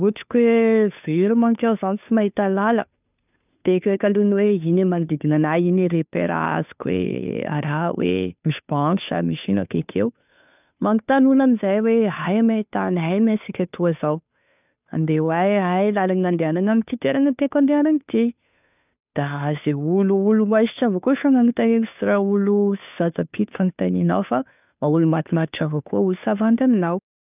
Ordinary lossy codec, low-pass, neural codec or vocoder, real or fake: none; 3.6 kHz; codec, 16 kHz, 2 kbps, FunCodec, trained on Chinese and English, 25 frames a second; fake